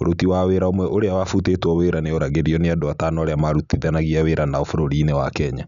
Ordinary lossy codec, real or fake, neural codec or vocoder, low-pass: none; real; none; 7.2 kHz